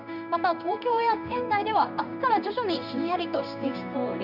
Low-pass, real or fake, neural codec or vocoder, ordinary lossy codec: 5.4 kHz; fake; codec, 16 kHz in and 24 kHz out, 1 kbps, XY-Tokenizer; none